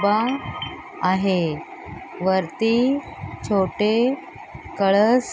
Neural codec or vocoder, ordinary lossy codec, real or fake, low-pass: none; none; real; none